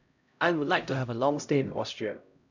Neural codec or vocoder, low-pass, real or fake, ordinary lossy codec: codec, 16 kHz, 0.5 kbps, X-Codec, HuBERT features, trained on LibriSpeech; 7.2 kHz; fake; none